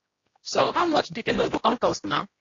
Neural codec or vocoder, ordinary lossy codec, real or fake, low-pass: codec, 16 kHz, 1 kbps, X-Codec, HuBERT features, trained on general audio; AAC, 32 kbps; fake; 7.2 kHz